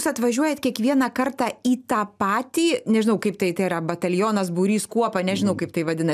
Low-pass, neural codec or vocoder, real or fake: 14.4 kHz; none; real